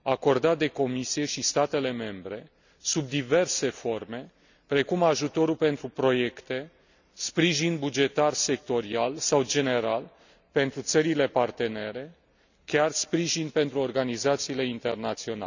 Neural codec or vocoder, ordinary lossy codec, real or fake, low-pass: none; none; real; 7.2 kHz